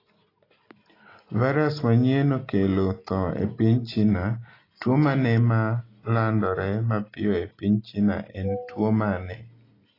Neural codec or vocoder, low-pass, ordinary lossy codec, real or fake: none; 5.4 kHz; AAC, 24 kbps; real